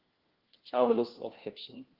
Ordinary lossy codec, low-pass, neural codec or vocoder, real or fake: Opus, 16 kbps; 5.4 kHz; codec, 16 kHz, 1 kbps, FunCodec, trained on LibriTTS, 50 frames a second; fake